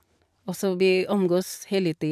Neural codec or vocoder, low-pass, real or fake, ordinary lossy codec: vocoder, 44.1 kHz, 128 mel bands every 512 samples, BigVGAN v2; 14.4 kHz; fake; AAC, 96 kbps